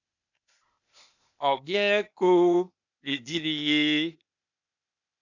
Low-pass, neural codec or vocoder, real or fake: 7.2 kHz; codec, 16 kHz, 0.8 kbps, ZipCodec; fake